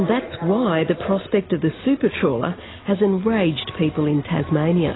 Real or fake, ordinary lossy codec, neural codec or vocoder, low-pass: real; AAC, 16 kbps; none; 7.2 kHz